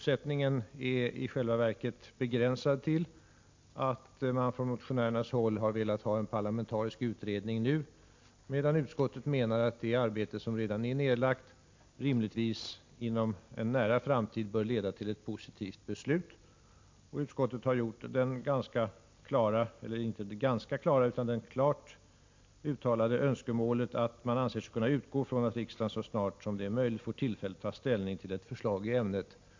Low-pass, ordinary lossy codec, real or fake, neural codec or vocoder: 7.2 kHz; MP3, 48 kbps; real; none